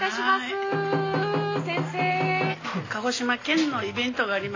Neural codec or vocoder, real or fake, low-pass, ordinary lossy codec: none; real; 7.2 kHz; AAC, 32 kbps